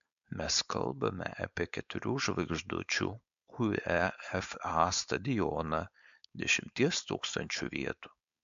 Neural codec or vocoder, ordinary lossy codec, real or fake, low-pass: codec, 16 kHz, 4.8 kbps, FACodec; MP3, 64 kbps; fake; 7.2 kHz